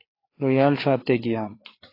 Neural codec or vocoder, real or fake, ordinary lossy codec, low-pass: codec, 16 kHz, 4 kbps, FreqCodec, larger model; fake; AAC, 24 kbps; 5.4 kHz